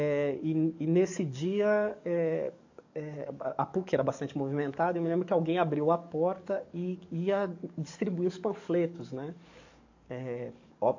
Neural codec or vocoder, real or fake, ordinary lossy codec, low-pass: codec, 44.1 kHz, 7.8 kbps, DAC; fake; AAC, 48 kbps; 7.2 kHz